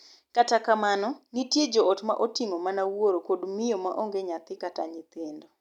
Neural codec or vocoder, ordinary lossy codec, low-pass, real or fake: none; none; 19.8 kHz; real